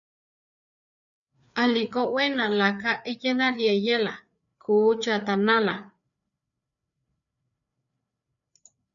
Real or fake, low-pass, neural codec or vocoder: fake; 7.2 kHz; codec, 16 kHz, 4 kbps, FreqCodec, larger model